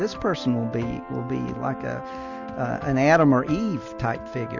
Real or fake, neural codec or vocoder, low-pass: real; none; 7.2 kHz